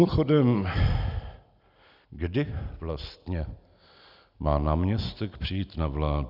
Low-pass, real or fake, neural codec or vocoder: 5.4 kHz; real; none